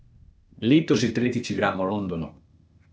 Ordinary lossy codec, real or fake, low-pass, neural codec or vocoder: none; fake; none; codec, 16 kHz, 0.8 kbps, ZipCodec